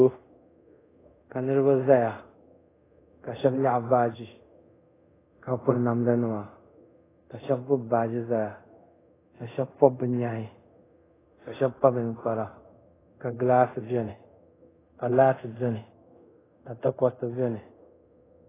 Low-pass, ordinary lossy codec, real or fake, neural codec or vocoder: 3.6 kHz; AAC, 16 kbps; fake; codec, 24 kHz, 0.5 kbps, DualCodec